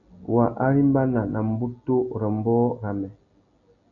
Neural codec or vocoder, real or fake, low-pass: none; real; 7.2 kHz